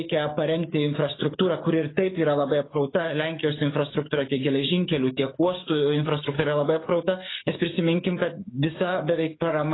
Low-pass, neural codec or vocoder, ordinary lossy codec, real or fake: 7.2 kHz; codec, 44.1 kHz, 7.8 kbps, DAC; AAC, 16 kbps; fake